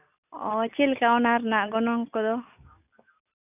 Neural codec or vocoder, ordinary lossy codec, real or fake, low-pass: none; none; real; 3.6 kHz